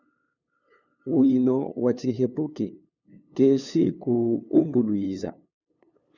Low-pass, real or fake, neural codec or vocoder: 7.2 kHz; fake; codec, 16 kHz, 2 kbps, FunCodec, trained on LibriTTS, 25 frames a second